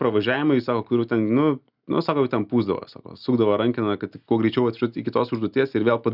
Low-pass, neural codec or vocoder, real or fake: 5.4 kHz; none; real